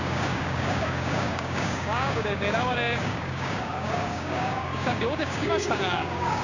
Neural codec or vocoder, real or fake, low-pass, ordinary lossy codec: codec, 16 kHz, 6 kbps, DAC; fake; 7.2 kHz; none